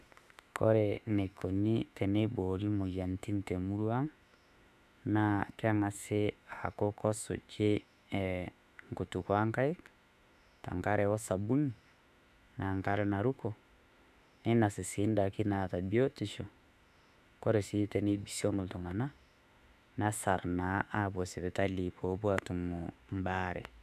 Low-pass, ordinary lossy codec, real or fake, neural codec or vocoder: 14.4 kHz; none; fake; autoencoder, 48 kHz, 32 numbers a frame, DAC-VAE, trained on Japanese speech